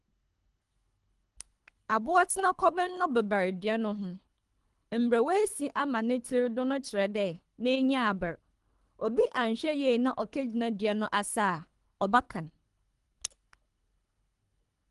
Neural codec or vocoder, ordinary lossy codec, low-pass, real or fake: codec, 24 kHz, 3 kbps, HILCodec; Opus, 24 kbps; 10.8 kHz; fake